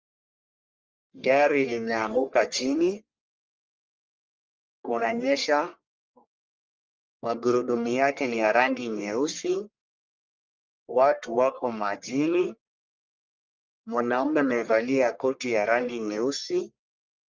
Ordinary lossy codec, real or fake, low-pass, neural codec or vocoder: Opus, 24 kbps; fake; 7.2 kHz; codec, 44.1 kHz, 1.7 kbps, Pupu-Codec